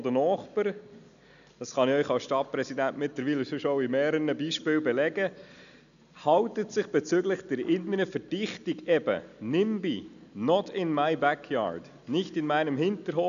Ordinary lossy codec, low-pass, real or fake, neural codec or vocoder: none; 7.2 kHz; real; none